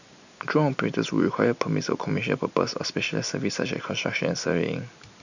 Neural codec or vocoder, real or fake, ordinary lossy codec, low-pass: none; real; none; 7.2 kHz